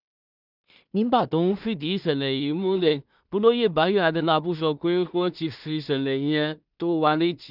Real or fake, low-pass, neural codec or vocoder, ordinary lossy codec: fake; 5.4 kHz; codec, 16 kHz in and 24 kHz out, 0.4 kbps, LongCat-Audio-Codec, two codebook decoder; none